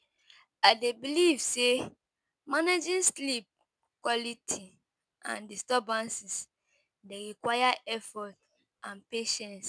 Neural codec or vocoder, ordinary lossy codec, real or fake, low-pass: none; none; real; none